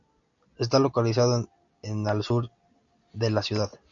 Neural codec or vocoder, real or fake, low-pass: none; real; 7.2 kHz